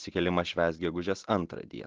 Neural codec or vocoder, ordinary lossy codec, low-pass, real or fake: none; Opus, 16 kbps; 7.2 kHz; real